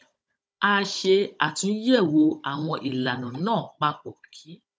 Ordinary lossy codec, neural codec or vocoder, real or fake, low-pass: none; codec, 16 kHz, 16 kbps, FunCodec, trained on Chinese and English, 50 frames a second; fake; none